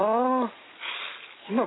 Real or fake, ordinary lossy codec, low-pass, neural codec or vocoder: real; AAC, 16 kbps; 7.2 kHz; none